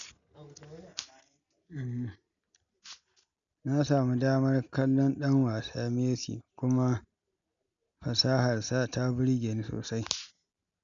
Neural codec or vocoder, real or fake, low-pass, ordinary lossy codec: none; real; 7.2 kHz; none